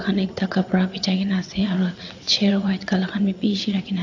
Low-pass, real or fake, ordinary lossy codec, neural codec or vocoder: 7.2 kHz; fake; AAC, 48 kbps; vocoder, 22.05 kHz, 80 mel bands, WaveNeXt